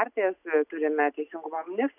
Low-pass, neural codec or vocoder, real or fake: 3.6 kHz; none; real